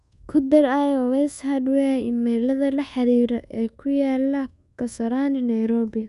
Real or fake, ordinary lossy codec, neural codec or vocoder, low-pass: fake; none; codec, 24 kHz, 1.2 kbps, DualCodec; 10.8 kHz